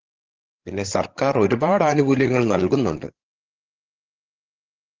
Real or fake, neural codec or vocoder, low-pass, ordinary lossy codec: fake; codec, 16 kHz, 16 kbps, FreqCodec, larger model; 7.2 kHz; Opus, 16 kbps